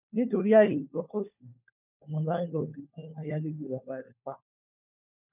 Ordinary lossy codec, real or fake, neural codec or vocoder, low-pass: none; fake; codec, 16 kHz, 4 kbps, FunCodec, trained on LibriTTS, 50 frames a second; 3.6 kHz